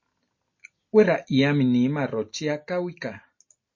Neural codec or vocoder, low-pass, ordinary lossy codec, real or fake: none; 7.2 kHz; MP3, 32 kbps; real